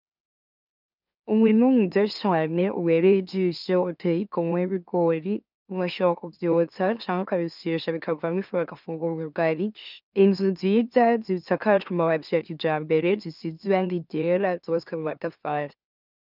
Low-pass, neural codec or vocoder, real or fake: 5.4 kHz; autoencoder, 44.1 kHz, a latent of 192 numbers a frame, MeloTTS; fake